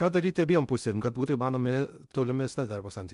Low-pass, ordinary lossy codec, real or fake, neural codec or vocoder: 10.8 kHz; MP3, 96 kbps; fake; codec, 16 kHz in and 24 kHz out, 0.6 kbps, FocalCodec, streaming, 2048 codes